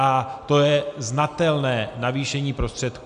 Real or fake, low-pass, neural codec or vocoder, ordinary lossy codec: real; 9.9 kHz; none; AAC, 96 kbps